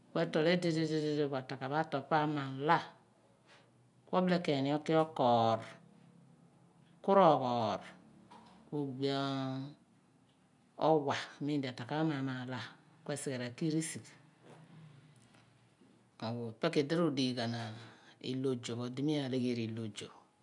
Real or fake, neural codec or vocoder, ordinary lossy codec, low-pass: real; none; none; 10.8 kHz